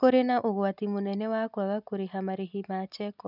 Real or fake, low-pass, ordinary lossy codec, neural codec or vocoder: real; 5.4 kHz; none; none